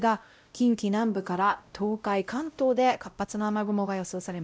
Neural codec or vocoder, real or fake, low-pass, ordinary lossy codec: codec, 16 kHz, 0.5 kbps, X-Codec, WavLM features, trained on Multilingual LibriSpeech; fake; none; none